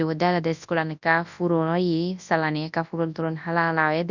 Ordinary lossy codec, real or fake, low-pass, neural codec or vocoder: none; fake; 7.2 kHz; codec, 24 kHz, 0.9 kbps, WavTokenizer, large speech release